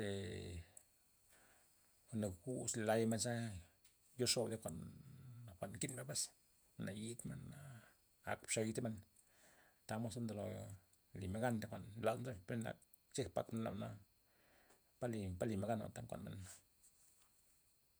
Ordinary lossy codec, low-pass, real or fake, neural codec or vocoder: none; none; fake; vocoder, 48 kHz, 128 mel bands, Vocos